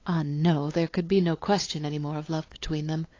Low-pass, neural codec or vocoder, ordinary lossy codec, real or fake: 7.2 kHz; codec, 16 kHz, 8 kbps, FunCodec, trained on LibriTTS, 25 frames a second; AAC, 32 kbps; fake